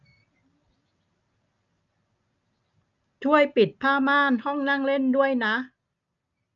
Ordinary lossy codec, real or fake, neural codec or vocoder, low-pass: none; real; none; 7.2 kHz